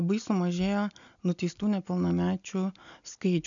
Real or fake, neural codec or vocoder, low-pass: real; none; 7.2 kHz